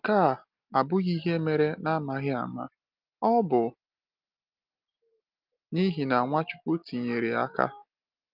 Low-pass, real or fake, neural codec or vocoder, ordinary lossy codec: 5.4 kHz; real; none; Opus, 32 kbps